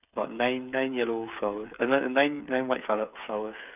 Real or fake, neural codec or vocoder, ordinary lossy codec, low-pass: fake; codec, 16 kHz, 8 kbps, FreqCodec, smaller model; none; 3.6 kHz